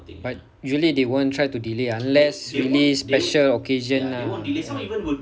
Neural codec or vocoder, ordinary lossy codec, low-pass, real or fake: none; none; none; real